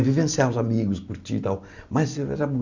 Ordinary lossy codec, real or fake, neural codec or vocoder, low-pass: none; real; none; 7.2 kHz